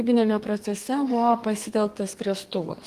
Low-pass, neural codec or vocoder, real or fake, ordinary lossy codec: 14.4 kHz; codec, 32 kHz, 1.9 kbps, SNAC; fake; Opus, 24 kbps